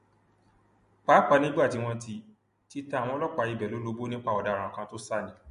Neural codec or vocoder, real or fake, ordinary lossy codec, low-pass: none; real; MP3, 48 kbps; 10.8 kHz